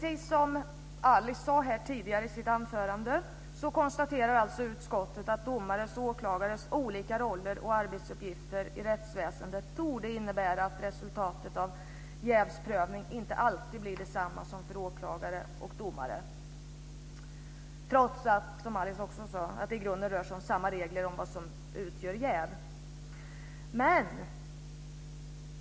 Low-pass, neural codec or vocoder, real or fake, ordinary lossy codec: none; none; real; none